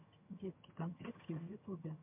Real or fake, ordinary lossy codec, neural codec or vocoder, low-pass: fake; MP3, 32 kbps; vocoder, 22.05 kHz, 80 mel bands, HiFi-GAN; 3.6 kHz